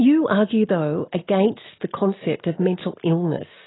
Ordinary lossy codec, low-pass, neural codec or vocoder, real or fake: AAC, 16 kbps; 7.2 kHz; none; real